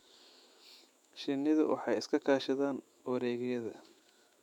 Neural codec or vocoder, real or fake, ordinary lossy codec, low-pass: autoencoder, 48 kHz, 128 numbers a frame, DAC-VAE, trained on Japanese speech; fake; none; 19.8 kHz